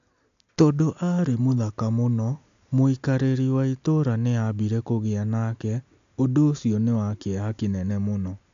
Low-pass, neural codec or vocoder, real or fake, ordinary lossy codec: 7.2 kHz; none; real; AAC, 64 kbps